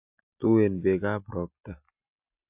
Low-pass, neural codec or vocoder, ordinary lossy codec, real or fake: 3.6 kHz; none; AAC, 32 kbps; real